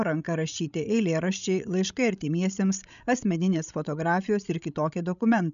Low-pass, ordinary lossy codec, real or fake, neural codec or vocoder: 7.2 kHz; MP3, 96 kbps; fake; codec, 16 kHz, 16 kbps, FreqCodec, larger model